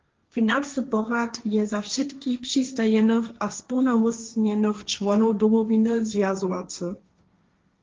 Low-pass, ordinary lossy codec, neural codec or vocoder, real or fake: 7.2 kHz; Opus, 32 kbps; codec, 16 kHz, 1.1 kbps, Voila-Tokenizer; fake